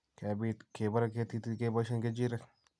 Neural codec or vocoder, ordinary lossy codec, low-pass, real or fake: none; none; none; real